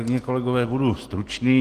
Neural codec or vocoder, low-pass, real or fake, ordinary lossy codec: none; 14.4 kHz; real; Opus, 24 kbps